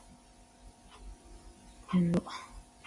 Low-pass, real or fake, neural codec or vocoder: 10.8 kHz; real; none